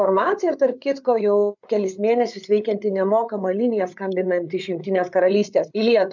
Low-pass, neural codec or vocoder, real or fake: 7.2 kHz; codec, 16 kHz, 8 kbps, FreqCodec, larger model; fake